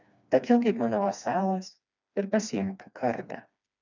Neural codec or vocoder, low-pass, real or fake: codec, 16 kHz, 2 kbps, FreqCodec, smaller model; 7.2 kHz; fake